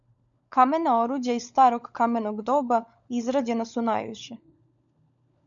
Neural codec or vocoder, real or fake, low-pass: codec, 16 kHz, 16 kbps, FunCodec, trained on LibriTTS, 50 frames a second; fake; 7.2 kHz